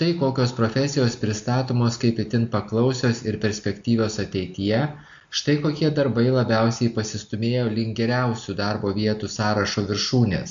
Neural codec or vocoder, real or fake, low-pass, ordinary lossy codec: none; real; 7.2 kHz; AAC, 64 kbps